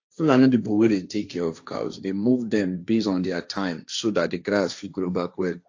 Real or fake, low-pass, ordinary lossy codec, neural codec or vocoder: fake; 7.2 kHz; AAC, 48 kbps; codec, 16 kHz, 1.1 kbps, Voila-Tokenizer